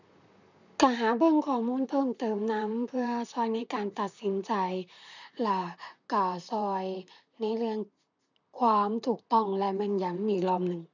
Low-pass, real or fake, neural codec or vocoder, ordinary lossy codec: 7.2 kHz; fake; vocoder, 44.1 kHz, 128 mel bands, Pupu-Vocoder; none